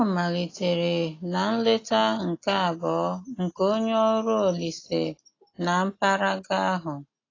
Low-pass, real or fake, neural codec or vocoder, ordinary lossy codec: 7.2 kHz; real; none; AAC, 32 kbps